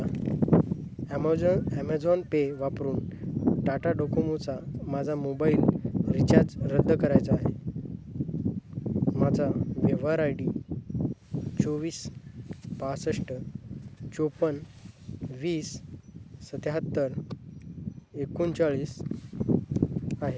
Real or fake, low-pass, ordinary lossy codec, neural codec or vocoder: real; none; none; none